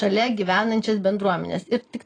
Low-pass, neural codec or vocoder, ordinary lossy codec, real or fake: 9.9 kHz; none; AAC, 32 kbps; real